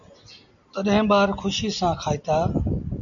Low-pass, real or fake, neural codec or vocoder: 7.2 kHz; real; none